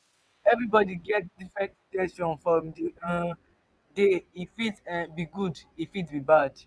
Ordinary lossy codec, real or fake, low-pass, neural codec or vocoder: none; fake; none; vocoder, 22.05 kHz, 80 mel bands, WaveNeXt